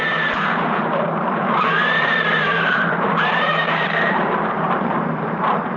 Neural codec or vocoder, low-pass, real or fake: vocoder, 44.1 kHz, 128 mel bands every 512 samples, BigVGAN v2; 7.2 kHz; fake